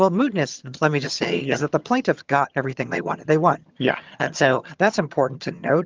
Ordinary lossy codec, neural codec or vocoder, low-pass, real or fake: Opus, 32 kbps; vocoder, 22.05 kHz, 80 mel bands, HiFi-GAN; 7.2 kHz; fake